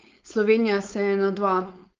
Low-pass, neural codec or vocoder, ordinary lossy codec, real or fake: 7.2 kHz; codec, 16 kHz, 4.8 kbps, FACodec; Opus, 24 kbps; fake